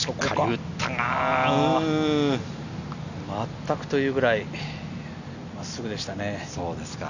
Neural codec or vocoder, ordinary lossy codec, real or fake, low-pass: none; none; real; 7.2 kHz